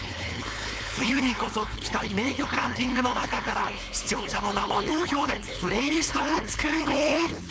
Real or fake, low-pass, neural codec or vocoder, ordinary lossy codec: fake; none; codec, 16 kHz, 4.8 kbps, FACodec; none